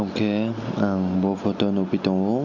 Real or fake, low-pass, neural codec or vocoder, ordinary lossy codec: real; 7.2 kHz; none; none